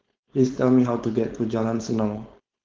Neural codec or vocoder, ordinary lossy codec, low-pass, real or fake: codec, 16 kHz, 4.8 kbps, FACodec; Opus, 16 kbps; 7.2 kHz; fake